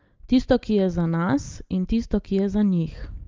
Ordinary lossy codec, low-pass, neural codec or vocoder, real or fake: Opus, 32 kbps; 7.2 kHz; none; real